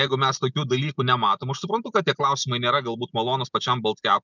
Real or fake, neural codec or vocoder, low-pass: real; none; 7.2 kHz